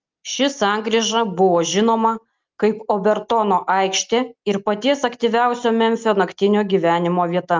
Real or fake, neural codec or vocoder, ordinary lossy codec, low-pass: real; none; Opus, 24 kbps; 7.2 kHz